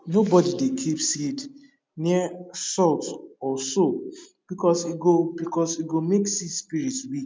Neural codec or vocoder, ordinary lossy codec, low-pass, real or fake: none; none; none; real